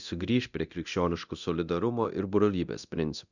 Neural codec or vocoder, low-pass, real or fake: codec, 24 kHz, 0.9 kbps, DualCodec; 7.2 kHz; fake